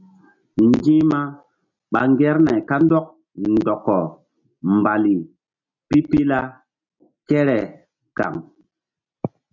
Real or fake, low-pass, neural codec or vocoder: real; 7.2 kHz; none